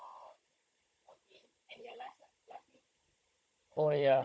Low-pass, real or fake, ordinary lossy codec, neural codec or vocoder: none; fake; none; codec, 16 kHz, 16 kbps, FunCodec, trained on Chinese and English, 50 frames a second